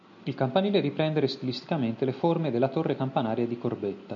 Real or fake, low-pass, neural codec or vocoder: real; 7.2 kHz; none